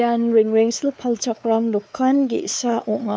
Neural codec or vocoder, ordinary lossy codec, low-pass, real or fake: codec, 16 kHz, 4 kbps, X-Codec, WavLM features, trained on Multilingual LibriSpeech; none; none; fake